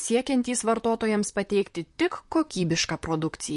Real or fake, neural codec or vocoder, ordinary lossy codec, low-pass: fake; vocoder, 44.1 kHz, 128 mel bands, Pupu-Vocoder; MP3, 48 kbps; 14.4 kHz